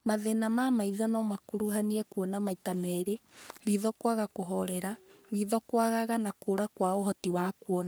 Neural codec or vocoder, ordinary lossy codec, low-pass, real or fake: codec, 44.1 kHz, 3.4 kbps, Pupu-Codec; none; none; fake